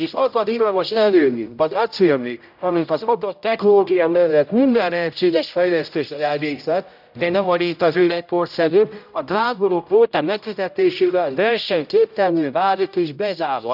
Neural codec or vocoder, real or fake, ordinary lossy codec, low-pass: codec, 16 kHz, 0.5 kbps, X-Codec, HuBERT features, trained on general audio; fake; none; 5.4 kHz